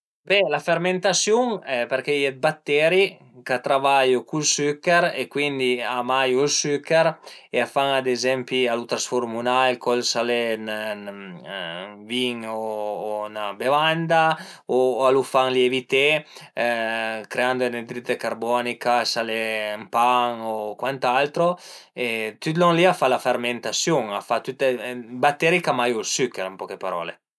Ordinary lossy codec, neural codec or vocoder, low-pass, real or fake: none; none; none; real